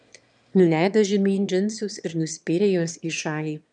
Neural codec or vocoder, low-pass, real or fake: autoencoder, 22.05 kHz, a latent of 192 numbers a frame, VITS, trained on one speaker; 9.9 kHz; fake